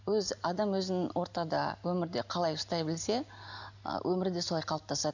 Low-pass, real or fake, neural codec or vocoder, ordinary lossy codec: 7.2 kHz; real; none; none